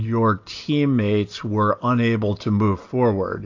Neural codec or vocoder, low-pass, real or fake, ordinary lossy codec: none; 7.2 kHz; real; AAC, 48 kbps